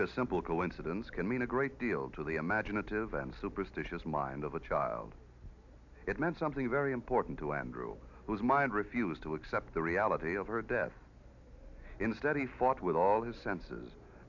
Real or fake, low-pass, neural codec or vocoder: real; 7.2 kHz; none